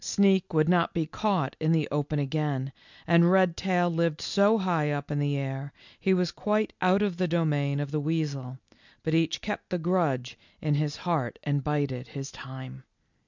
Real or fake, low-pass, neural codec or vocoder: real; 7.2 kHz; none